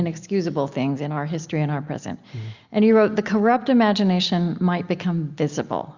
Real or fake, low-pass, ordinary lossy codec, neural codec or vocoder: real; 7.2 kHz; Opus, 64 kbps; none